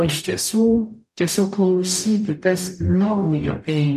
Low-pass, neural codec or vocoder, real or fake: 14.4 kHz; codec, 44.1 kHz, 0.9 kbps, DAC; fake